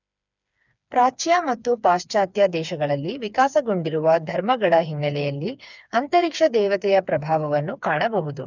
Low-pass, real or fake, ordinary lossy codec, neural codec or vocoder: 7.2 kHz; fake; none; codec, 16 kHz, 4 kbps, FreqCodec, smaller model